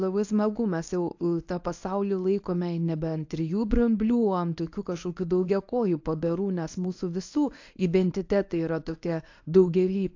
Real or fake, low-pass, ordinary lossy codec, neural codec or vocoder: fake; 7.2 kHz; AAC, 48 kbps; codec, 24 kHz, 0.9 kbps, WavTokenizer, medium speech release version 1